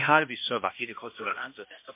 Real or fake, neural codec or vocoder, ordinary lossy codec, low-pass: fake; codec, 16 kHz, 1 kbps, X-Codec, HuBERT features, trained on balanced general audio; MP3, 24 kbps; 3.6 kHz